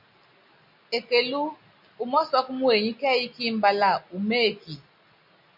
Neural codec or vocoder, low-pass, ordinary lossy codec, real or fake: none; 5.4 kHz; MP3, 48 kbps; real